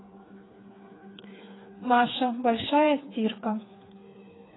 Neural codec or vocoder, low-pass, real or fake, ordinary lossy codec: codec, 16 kHz, 4 kbps, FreqCodec, smaller model; 7.2 kHz; fake; AAC, 16 kbps